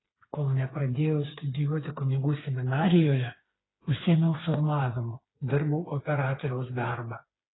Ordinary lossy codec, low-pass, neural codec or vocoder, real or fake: AAC, 16 kbps; 7.2 kHz; codec, 16 kHz, 4 kbps, FreqCodec, smaller model; fake